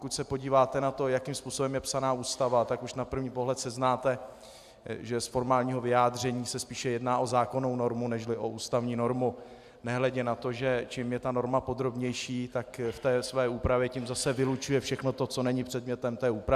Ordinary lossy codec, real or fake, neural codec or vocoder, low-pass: AAC, 96 kbps; real; none; 14.4 kHz